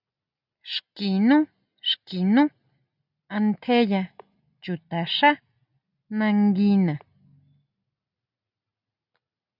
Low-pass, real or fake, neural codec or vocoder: 5.4 kHz; real; none